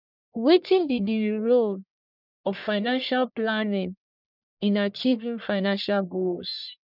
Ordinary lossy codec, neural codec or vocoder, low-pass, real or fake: none; codec, 44.1 kHz, 1.7 kbps, Pupu-Codec; 5.4 kHz; fake